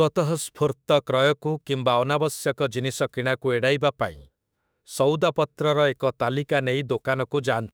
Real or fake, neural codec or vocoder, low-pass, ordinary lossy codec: fake; autoencoder, 48 kHz, 32 numbers a frame, DAC-VAE, trained on Japanese speech; none; none